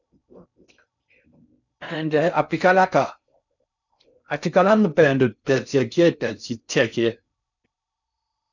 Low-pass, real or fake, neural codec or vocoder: 7.2 kHz; fake; codec, 16 kHz in and 24 kHz out, 0.6 kbps, FocalCodec, streaming, 4096 codes